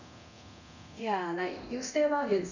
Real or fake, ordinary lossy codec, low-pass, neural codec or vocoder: fake; none; 7.2 kHz; codec, 24 kHz, 0.9 kbps, DualCodec